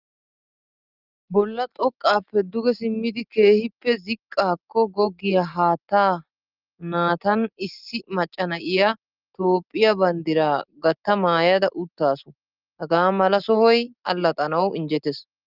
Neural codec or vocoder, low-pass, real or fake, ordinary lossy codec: none; 5.4 kHz; real; Opus, 24 kbps